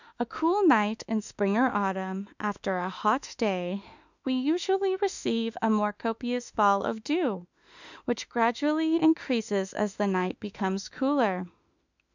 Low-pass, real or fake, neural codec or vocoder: 7.2 kHz; fake; autoencoder, 48 kHz, 32 numbers a frame, DAC-VAE, trained on Japanese speech